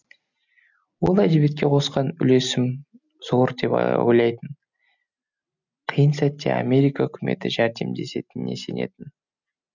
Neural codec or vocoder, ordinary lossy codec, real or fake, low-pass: none; none; real; 7.2 kHz